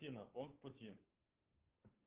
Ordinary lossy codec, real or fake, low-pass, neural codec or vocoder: Opus, 16 kbps; fake; 3.6 kHz; codec, 16 kHz, 2 kbps, FunCodec, trained on Chinese and English, 25 frames a second